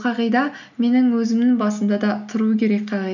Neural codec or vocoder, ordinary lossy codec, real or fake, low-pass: none; none; real; 7.2 kHz